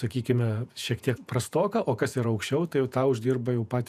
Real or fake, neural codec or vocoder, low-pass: fake; vocoder, 48 kHz, 128 mel bands, Vocos; 14.4 kHz